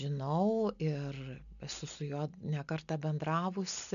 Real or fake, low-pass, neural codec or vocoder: real; 7.2 kHz; none